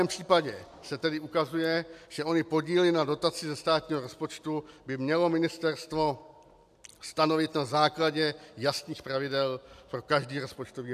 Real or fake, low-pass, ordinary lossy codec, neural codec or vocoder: real; 14.4 kHz; AAC, 96 kbps; none